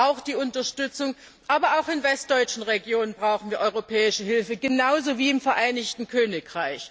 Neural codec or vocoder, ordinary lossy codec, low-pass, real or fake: none; none; none; real